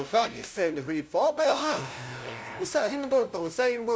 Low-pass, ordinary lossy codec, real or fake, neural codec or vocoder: none; none; fake; codec, 16 kHz, 0.5 kbps, FunCodec, trained on LibriTTS, 25 frames a second